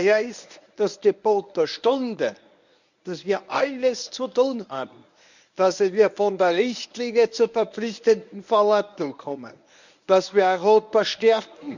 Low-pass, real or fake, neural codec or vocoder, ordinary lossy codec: 7.2 kHz; fake; codec, 24 kHz, 0.9 kbps, WavTokenizer, medium speech release version 1; none